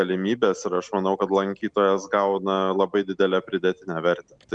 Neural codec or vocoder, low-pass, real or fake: none; 9.9 kHz; real